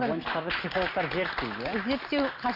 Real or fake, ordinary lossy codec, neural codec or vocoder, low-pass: real; Opus, 64 kbps; none; 5.4 kHz